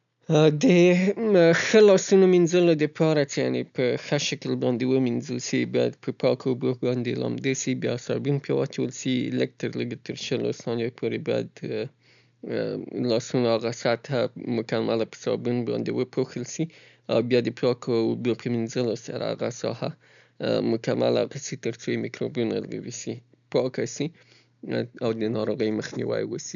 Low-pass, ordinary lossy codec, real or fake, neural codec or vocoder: 7.2 kHz; none; real; none